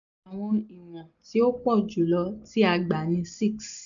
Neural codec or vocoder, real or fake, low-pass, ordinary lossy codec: none; real; 7.2 kHz; none